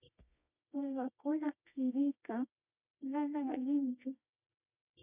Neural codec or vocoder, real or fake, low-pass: codec, 24 kHz, 0.9 kbps, WavTokenizer, medium music audio release; fake; 3.6 kHz